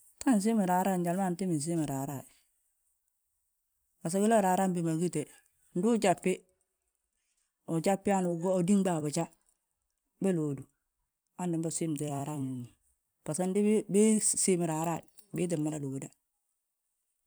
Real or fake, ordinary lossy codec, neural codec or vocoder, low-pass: fake; none; vocoder, 44.1 kHz, 128 mel bands every 256 samples, BigVGAN v2; none